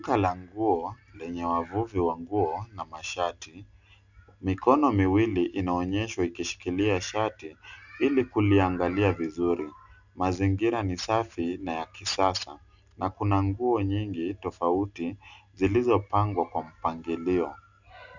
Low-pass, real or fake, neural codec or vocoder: 7.2 kHz; real; none